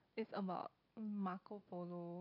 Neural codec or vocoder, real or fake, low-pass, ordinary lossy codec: none; real; 5.4 kHz; AAC, 48 kbps